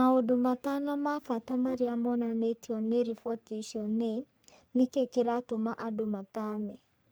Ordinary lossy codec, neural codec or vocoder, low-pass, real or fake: none; codec, 44.1 kHz, 3.4 kbps, Pupu-Codec; none; fake